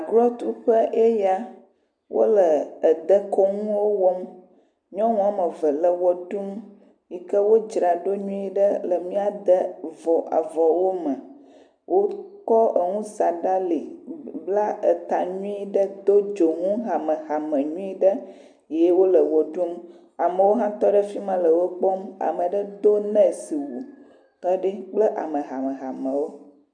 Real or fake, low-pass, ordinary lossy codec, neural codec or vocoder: real; 9.9 kHz; MP3, 96 kbps; none